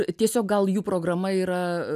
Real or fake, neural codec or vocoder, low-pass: real; none; 14.4 kHz